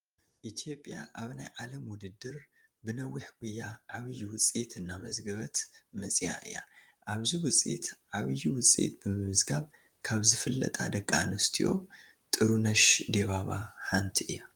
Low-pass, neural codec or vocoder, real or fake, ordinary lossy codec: 19.8 kHz; vocoder, 44.1 kHz, 128 mel bands, Pupu-Vocoder; fake; Opus, 32 kbps